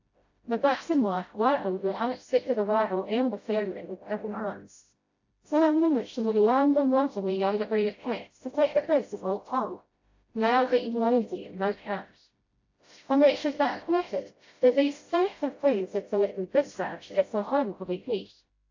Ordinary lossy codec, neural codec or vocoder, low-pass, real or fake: AAC, 48 kbps; codec, 16 kHz, 0.5 kbps, FreqCodec, smaller model; 7.2 kHz; fake